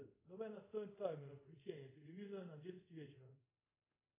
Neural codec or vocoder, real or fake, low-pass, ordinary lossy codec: codec, 24 kHz, 0.5 kbps, DualCodec; fake; 3.6 kHz; AAC, 24 kbps